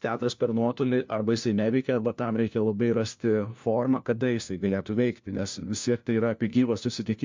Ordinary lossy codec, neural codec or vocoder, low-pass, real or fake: MP3, 64 kbps; codec, 16 kHz, 1 kbps, FunCodec, trained on LibriTTS, 50 frames a second; 7.2 kHz; fake